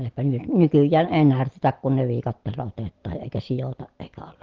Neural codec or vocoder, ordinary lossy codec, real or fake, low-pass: none; Opus, 16 kbps; real; 7.2 kHz